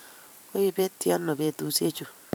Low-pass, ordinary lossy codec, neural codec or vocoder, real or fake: none; none; vocoder, 44.1 kHz, 128 mel bands every 512 samples, BigVGAN v2; fake